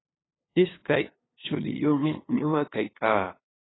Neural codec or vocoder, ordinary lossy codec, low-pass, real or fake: codec, 16 kHz, 2 kbps, FunCodec, trained on LibriTTS, 25 frames a second; AAC, 16 kbps; 7.2 kHz; fake